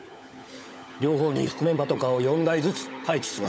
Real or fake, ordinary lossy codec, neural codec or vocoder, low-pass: fake; none; codec, 16 kHz, 16 kbps, FunCodec, trained on LibriTTS, 50 frames a second; none